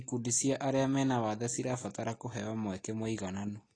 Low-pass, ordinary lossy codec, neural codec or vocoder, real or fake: 9.9 kHz; AAC, 32 kbps; none; real